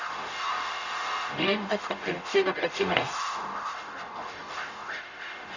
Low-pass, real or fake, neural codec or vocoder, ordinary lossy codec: 7.2 kHz; fake; codec, 44.1 kHz, 0.9 kbps, DAC; Opus, 64 kbps